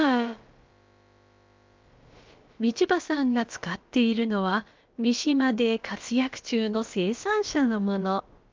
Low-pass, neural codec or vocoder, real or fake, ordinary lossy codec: 7.2 kHz; codec, 16 kHz, about 1 kbps, DyCAST, with the encoder's durations; fake; Opus, 24 kbps